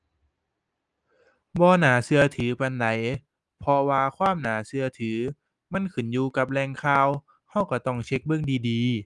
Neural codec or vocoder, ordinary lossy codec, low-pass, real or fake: none; Opus, 24 kbps; 10.8 kHz; real